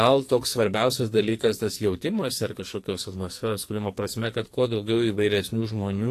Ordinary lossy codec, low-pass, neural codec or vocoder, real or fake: AAC, 64 kbps; 14.4 kHz; codec, 44.1 kHz, 2.6 kbps, SNAC; fake